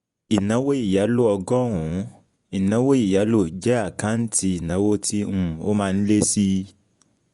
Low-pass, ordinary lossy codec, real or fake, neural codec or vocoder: 10.8 kHz; Opus, 64 kbps; fake; vocoder, 24 kHz, 100 mel bands, Vocos